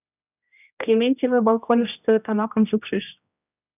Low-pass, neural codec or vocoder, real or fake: 3.6 kHz; codec, 16 kHz, 1 kbps, X-Codec, HuBERT features, trained on general audio; fake